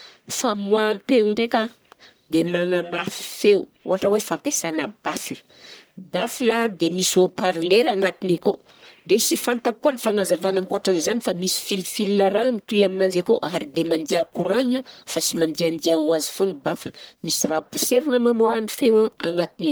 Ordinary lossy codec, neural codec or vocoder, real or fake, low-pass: none; codec, 44.1 kHz, 1.7 kbps, Pupu-Codec; fake; none